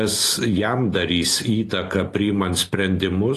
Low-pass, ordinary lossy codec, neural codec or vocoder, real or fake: 14.4 kHz; AAC, 48 kbps; none; real